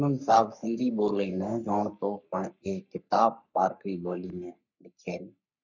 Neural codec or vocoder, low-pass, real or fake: codec, 44.1 kHz, 3.4 kbps, Pupu-Codec; 7.2 kHz; fake